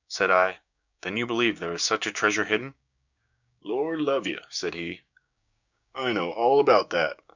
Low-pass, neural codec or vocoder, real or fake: 7.2 kHz; codec, 16 kHz, 6 kbps, DAC; fake